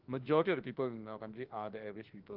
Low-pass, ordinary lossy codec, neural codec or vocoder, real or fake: 5.4 kHz; Opus, 16 kbps; autoencoder, 48 kHz, 32 numbers a frame, DAC-VAE, trained on Japanese speech; fake